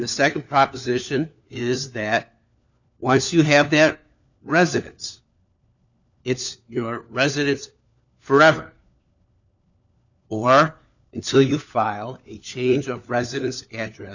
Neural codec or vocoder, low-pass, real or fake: codec, 16 kHz, 4 kbps, FunCodec, trained on LibriTTS, 50 frames a second; 7.2 kHz; fake